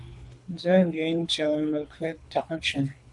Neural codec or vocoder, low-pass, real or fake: codec, 24 kHz, 3 kbps, HILCodec; 10.8 kHz; fake